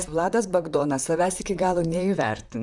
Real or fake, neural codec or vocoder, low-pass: fake; vocoder, 44.1 kHz, 128 mel bands, Pupu-Vocoder; 10.8 kHz